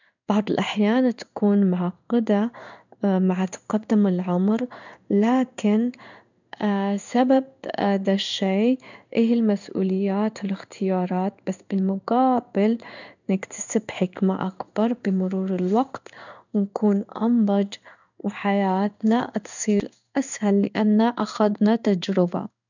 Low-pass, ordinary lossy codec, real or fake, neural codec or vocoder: 7.2 kHz; none; fake; codec, 16 kHz in and 24 kHz out, 1 kbps, XY-Tokenizer